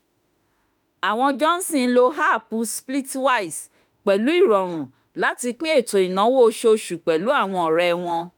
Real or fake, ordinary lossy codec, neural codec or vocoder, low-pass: fake; none; autoencoder, 48 kHz, 32 numbers a frame, DAC-VAE, trained on Japanese speech; none